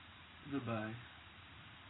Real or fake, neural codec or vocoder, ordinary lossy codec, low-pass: fake; vocoder, 44.1 kHz, 128 mel bands every 512 samples, BigVGAN v2; AAC, 16 kbps; 7.2 kHz